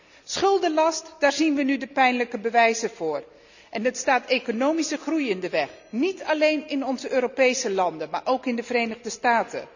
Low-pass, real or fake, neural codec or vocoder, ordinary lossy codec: 7.2 kHz; real; none; none